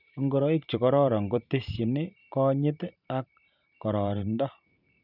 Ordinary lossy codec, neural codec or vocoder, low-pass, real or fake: none; vocoder, 44.1 kHz, 128 mel bands every 512 samples, BigVGAN v2; 5.4 kHz; fake